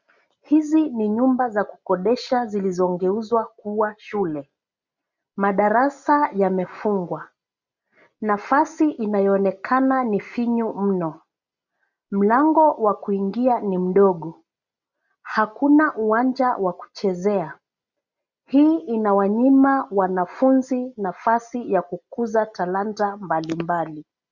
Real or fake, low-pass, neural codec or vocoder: real; 7.2 kHz; none